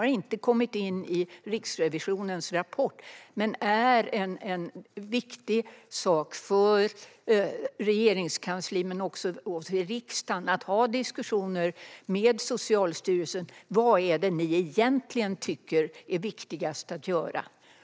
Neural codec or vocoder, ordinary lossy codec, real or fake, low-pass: none; none; real; none